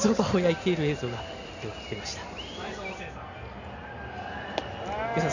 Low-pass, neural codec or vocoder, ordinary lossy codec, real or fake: 7.2 kHz; none; none; real